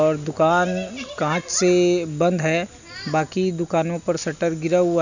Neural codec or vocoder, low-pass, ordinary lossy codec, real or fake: none; 7.2 kHz; none; real